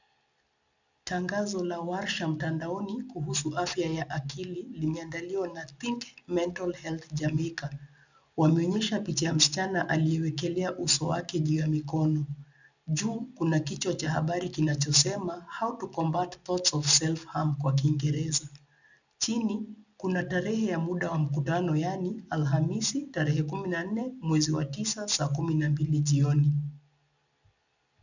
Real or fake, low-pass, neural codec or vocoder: real; 7.2 kHz; none